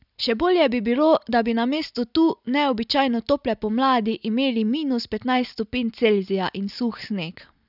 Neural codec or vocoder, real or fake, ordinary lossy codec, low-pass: none; real; none; 5.4 kHz